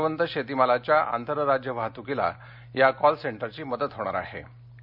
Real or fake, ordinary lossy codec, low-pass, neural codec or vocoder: real; none; 5.4 kHz; none